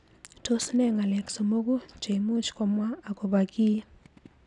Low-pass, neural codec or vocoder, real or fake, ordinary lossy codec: 10.8 kHz; none; real; none